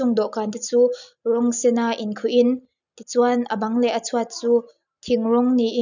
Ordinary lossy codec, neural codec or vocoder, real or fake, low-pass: none; none; real; 7.2 kHz